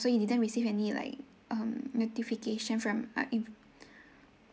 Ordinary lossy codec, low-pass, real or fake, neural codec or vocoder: none; none; real; none